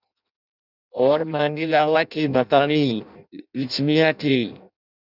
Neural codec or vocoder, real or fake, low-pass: codec, 16 kHz in and 24 kHz out, 0.6 kbps, FireRedTTS-2 codec; fake; 5.4 kHz